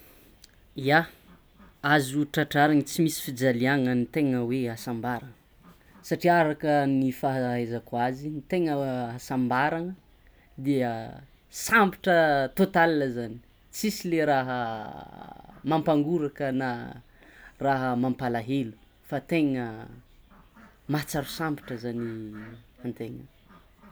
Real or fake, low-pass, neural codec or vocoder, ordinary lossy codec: real; none; none; none